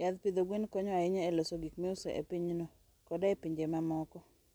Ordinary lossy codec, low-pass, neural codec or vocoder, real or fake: none; none; none; real